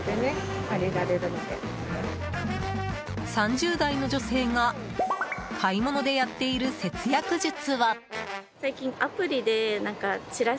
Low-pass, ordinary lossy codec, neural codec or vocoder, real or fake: none; none; none; real